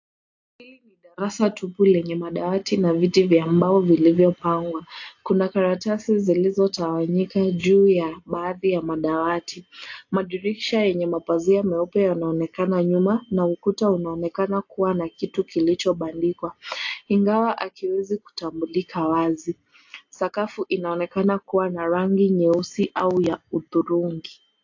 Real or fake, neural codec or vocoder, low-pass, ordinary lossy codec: real; none; 7.2 kHz; AAC, 48 kbps